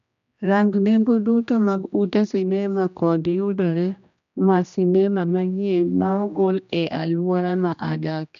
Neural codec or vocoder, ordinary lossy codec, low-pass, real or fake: codec, 16 kHz, 1 kbps, X-Codec, HuBERT features, trained on general audio; none; 7.2 kHz; fake